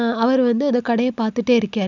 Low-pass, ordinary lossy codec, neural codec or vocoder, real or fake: 7.2 kHz; none; none; real